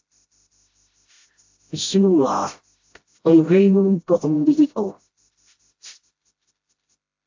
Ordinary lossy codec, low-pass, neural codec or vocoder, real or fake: AAC, 48 kbps; 7.2 kHz; codec, 16 kHz, 0.5 kbps, FreqCodec, smaller model; fake